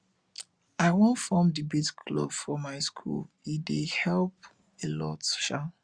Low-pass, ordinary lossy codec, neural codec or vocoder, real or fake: 9.9 kHz; Opus, 64 kbps; none; real